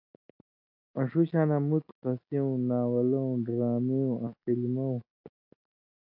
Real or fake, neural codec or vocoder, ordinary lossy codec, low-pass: real; none; AAC, 48 kbps; 5.4 kHz